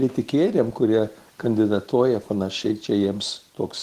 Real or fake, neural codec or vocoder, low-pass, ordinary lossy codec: real; none; 14.4 kHz; Opus, 16 kbps